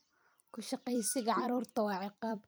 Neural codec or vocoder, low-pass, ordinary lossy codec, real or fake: vocoder, 44.1 kHz, 128 mel bands every 256 samples, BigVGAN v2; none; none; fake